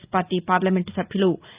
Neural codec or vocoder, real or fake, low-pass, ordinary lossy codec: none; real; 3.6 kHz; Opus, 24 kbps